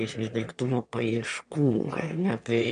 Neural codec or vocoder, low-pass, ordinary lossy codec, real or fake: autoencoder, 22.05 kHz, a latent of 192 numbers a frame, VITS, trained on one speaker; 9.9 kHz; MP3, 64 kbps; fake